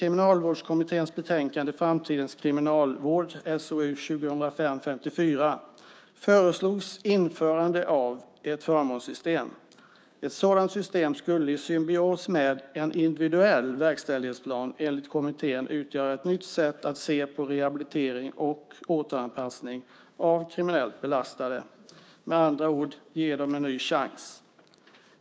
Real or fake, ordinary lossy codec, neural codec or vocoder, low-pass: fake; none; codec, 16 kHz, 6 kbps, DAC; none